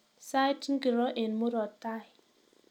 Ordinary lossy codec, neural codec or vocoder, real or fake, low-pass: none; none; real; 19.8 kHz